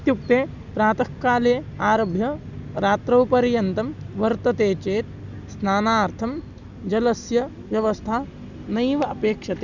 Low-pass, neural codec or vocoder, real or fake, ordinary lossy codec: 7.2 kHz; none; real; none